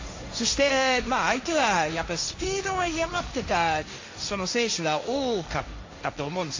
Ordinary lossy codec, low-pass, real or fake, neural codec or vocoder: AAC, 48 kbps; 7.2 kHz; fake; codec, 16 kHz, 1.1 kbps, Voila-Tokenizer